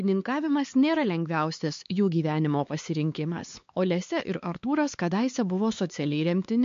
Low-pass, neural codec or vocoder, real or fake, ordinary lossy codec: 7.2 kHz; codec, 16 kHz, 4 kbps, X-Codec, HuBERT features, trained on LibriSpeech; fake; MP3, 48 kbps